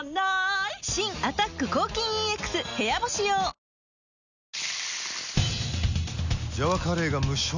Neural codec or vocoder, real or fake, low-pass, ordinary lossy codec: none; real; 7.2 kHz; none